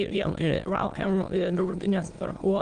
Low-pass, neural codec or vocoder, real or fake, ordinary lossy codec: 9.9 kHz; autoencoder, 22.05 kHz, a latent of 192 numbers a frame, VITS, trained on many speakers; fake; Opus, 24 kbps